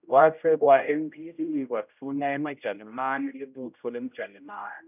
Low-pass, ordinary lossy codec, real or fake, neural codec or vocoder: 3.6 kHz; none; fake; codec, 16 kHz, 0.5 kbps, X-Codec, HuBERT features, trained on general audio